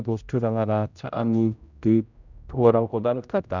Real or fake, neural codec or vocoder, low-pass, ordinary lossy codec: fake; codec, 16 kHz, 0.5 kbps, X-Codec, HuBERT features, trained on general audio; 7.2 kHz; none